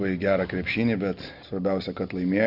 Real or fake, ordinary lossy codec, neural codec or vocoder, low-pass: real; Opus, 64 kbps; none; 5.4 kHz